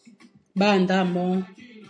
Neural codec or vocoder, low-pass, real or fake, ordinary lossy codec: none; 9.9 kHz; real; AAC, 64 kbps